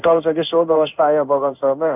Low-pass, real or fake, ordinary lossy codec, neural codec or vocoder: 3.6 kHz; fake; none; codec, 16 kHz in and 24 kHz out, 1 kbps, XY-Tokenizer